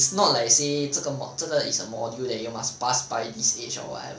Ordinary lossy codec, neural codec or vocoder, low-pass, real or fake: none; none; none; real